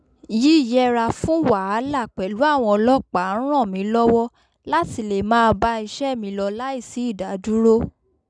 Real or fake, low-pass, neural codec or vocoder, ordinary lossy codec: real; 9.9 kHz; none; none